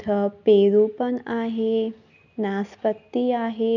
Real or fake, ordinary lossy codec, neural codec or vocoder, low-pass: real; none; none; 7.2 kHz